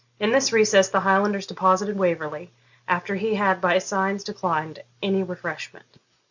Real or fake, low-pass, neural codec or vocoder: real; 7.2 kHz; none